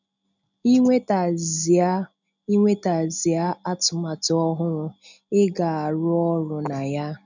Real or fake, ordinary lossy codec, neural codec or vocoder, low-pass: real; none; none; 7.2 kHz